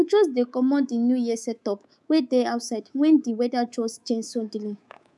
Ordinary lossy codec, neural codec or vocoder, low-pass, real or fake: none; codec, 24 kHz, 3.1 kbps, DualCodec; none; fake